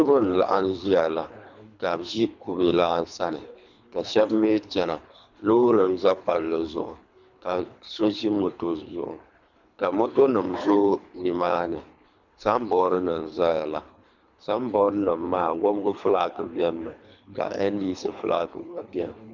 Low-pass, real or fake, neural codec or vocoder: 7.2 kHz; fake; codec, 24 kHz, 3 kbps, HILCodec